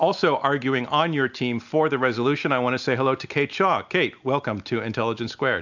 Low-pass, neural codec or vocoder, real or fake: 7.2 kHz; none; real